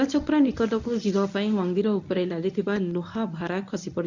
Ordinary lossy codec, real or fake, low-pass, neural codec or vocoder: none; fake; 7.2 kHz; codec, 24 kHz, 0.9 kbps, WavTokenizer, medium speech release version 1